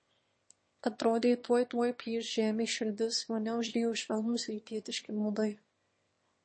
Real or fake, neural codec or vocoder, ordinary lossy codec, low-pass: fake; autoencoder, 22.05 kHz, a latent of 192 numbers a frame, VITS, trained on one speaker; MP3, 32 kbps; 9.9 kHz